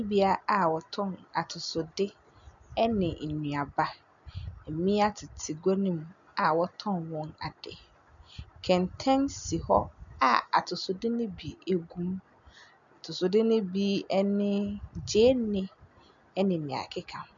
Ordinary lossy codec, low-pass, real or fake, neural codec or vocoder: MP3, 96 kbps; 7.2 kHz; real; none